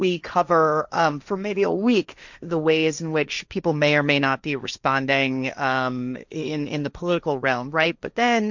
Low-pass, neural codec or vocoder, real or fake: 7.2 kHz; codec, 16 kHz, 1.1 kbps, Voila-Tokenizer; fake